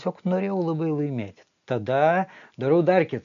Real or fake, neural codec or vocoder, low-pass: real; none; 7.2 kHz